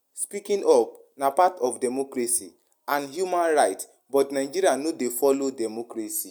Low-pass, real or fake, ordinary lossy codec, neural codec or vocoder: none; real; none; none